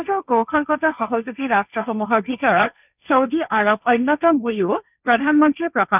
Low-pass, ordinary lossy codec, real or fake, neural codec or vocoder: 3.6 kHz; none; fake; codec, 16 kHz, 1.1 kbps, Voila-Tokenizer